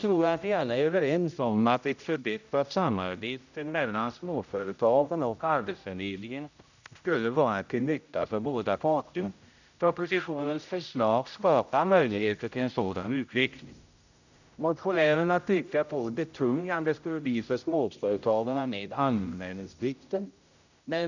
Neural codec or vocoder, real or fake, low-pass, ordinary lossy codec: codec, 16 kHz, 0.5 kbps, X-Codec, HuBERT features, trained on general audio; fake; 7.2 kHz; none